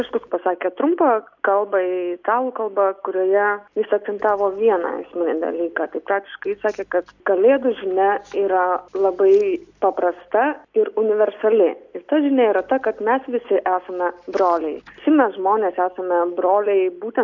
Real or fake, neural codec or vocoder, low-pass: real; none; 7.2 kHz